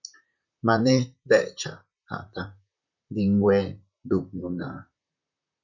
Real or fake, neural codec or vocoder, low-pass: fake; vocoder, 44.1 kHz, 128 mel bands, Pupu-Vocoder; 7.2 kHz